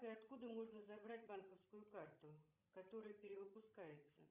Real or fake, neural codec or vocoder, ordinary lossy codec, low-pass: fake; codec, 16 kHz, 16 kbps, FreqCodec, larger model; Opus, 64 kbps; 3.6 kHz